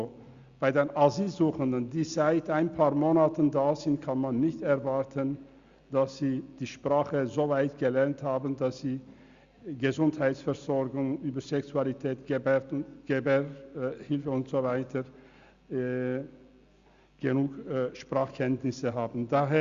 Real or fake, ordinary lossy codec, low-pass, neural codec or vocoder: real; Opus, 64 kbps; 7.2 kHz; none